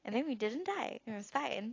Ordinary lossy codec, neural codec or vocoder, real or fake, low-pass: AAC, 32 kbps; none; real; 7.2 kHz